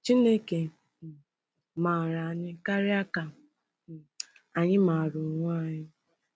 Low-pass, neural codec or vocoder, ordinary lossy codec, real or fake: none; none; none; real